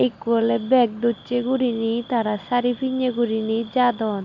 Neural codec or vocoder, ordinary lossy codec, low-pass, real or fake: none; none; 7.2 kHz; real